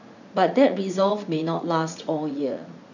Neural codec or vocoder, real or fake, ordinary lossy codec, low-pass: vocoder, 44.1 kHz, 80 mel bands, Vocos; fake; none; 7.2 kHz